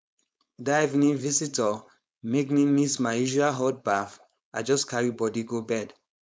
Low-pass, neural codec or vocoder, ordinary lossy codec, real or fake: none; codec, 16 kHz, 4.8 kbps, FACodec; none; fake